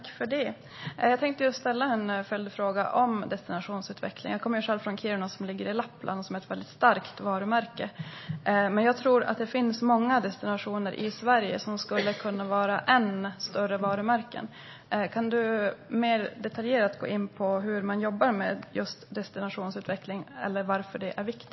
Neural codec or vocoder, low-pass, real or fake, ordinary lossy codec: none; 7.2 kHz; real; MP3, 24 kbps